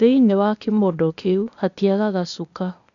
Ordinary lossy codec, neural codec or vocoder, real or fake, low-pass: none; codec, 16 kHz, 0.8 kbps, ZipCodec; fake; 7.2 kHz